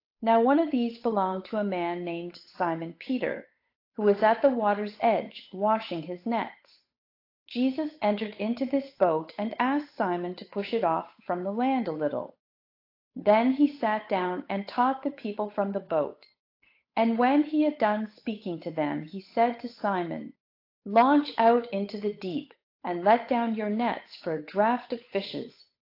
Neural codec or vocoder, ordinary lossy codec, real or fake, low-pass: codec, 16 kHz, 8 kbps, FunCodec, trained on Chinese and English, 25 frames a second; AAC, 32 kbps; fake; 5.4 kHz